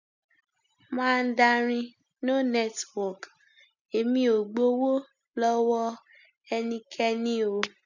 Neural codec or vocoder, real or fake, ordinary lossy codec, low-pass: none; real; none; 7.2 kHz